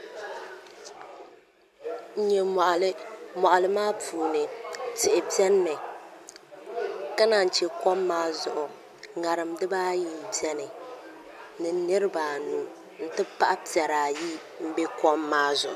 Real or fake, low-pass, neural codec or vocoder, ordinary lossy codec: real; 14.4 kHz; none; MP3, 96 kbps